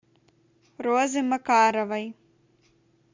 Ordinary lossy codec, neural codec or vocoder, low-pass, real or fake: MP3, 64 kbps; none; 7.2 kHz; real